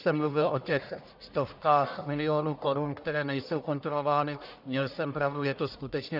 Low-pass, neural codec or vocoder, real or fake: 5.4 kHz; codec, 44.1 kHz, 1.7 kbps, Pupu-Codec; fake